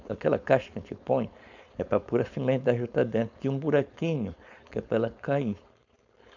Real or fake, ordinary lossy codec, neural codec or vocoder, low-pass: fake; none; codec, 16 kHz, 4.8 kbps, FACodec; 7.2 kHz